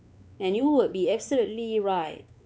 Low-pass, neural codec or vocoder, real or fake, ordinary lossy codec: none; codec, 16 kHz, 4 kbps, X-Codec, WavLM features, trained on Multilingual LibriSpeech; fake; none